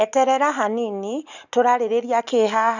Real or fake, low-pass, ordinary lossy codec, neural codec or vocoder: real; 7.2 kHz; none; none